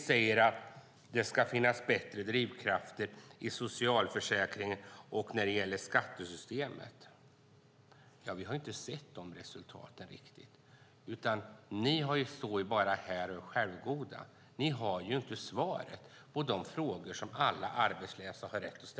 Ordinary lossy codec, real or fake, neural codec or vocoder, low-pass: none; real; none; none